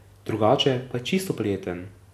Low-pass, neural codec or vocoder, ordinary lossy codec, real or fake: 14.4 kHz; none; none; real